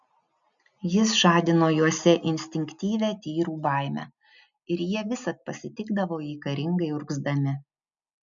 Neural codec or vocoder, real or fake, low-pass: none; real; 7.2 kHz